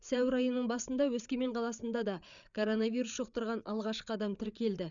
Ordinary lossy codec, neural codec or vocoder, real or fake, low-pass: none; codec, 16 kHz, 8 kbps, FreqCodec, larger model; fake; 7.2 kHz